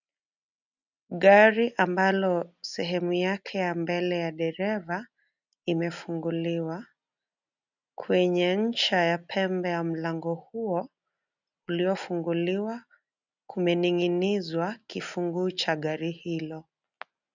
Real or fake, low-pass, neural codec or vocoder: real; 7.2 kHz; none